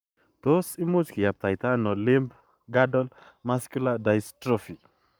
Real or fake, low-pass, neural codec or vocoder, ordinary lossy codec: fake; none; codec, 44.1 kHz, 7.8 kbps, Pupu-Codec; none